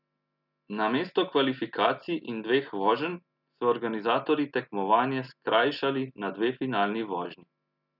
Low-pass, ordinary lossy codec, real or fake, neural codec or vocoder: 5.4 kHz; none; real; none